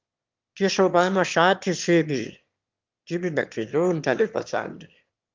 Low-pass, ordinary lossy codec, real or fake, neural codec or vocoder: 7.2 kHz; Opus, 24 kbps; fake; autoencoder, 22.05 kHz, a latent of 192 numbers a frame, VITS, trained on one speaker